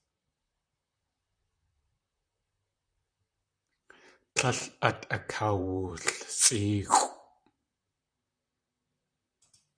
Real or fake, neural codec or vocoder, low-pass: fake; vocoder, 44.1 kHz, 128 mel bands, Pupu-Vocoder; 9.9 kHz